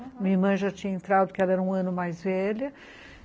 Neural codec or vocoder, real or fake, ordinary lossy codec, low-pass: none; real; none; none